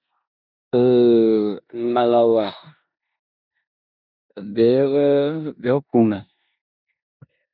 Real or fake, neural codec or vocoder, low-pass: fake; codec, 16 kHz in and 24 kHz out, 0.9 kbps, LongCat-Audio-Codec, four codebook decoder; 5.4 kHz